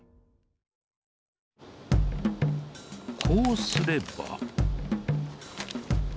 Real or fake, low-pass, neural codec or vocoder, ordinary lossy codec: real; none; none; none